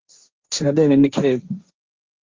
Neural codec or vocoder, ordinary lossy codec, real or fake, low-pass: codec, 16 kHz, 1.1 kbps, Voila-Tokenizer; Opus, 32 kbps; fake; 7.2 kHz